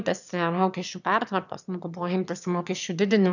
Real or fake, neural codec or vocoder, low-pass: fake; autoencoder, 22.05 kHz, a latent of 192 numbers a frame, VITS, trained on one speaker; 7.2 kHz